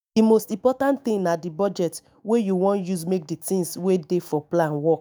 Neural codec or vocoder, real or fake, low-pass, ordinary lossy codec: autoencoder, 48 kHz, 128 numbers a frame, DAC-VAE, trained on Japanese speech; fake; none; none